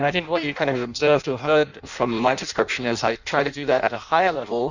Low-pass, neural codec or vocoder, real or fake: 7.2 kHz; codec, 16 kHz in and 24 kHz out, 0.6 kbps, FireRedTTS-2 codec; fake